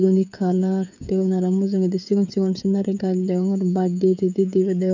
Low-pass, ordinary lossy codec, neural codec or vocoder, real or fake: 7.2 kHz; none; codec, 16 kHz, 16 kbps, FreqCodec, smaller model; fake